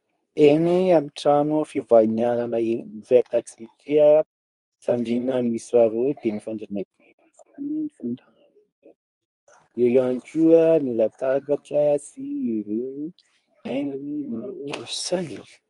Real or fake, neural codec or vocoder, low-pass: fake; codec, 24 kHz, 0.9 kbps, WavTokenizer, medium speech release version 2; 10.8 kHz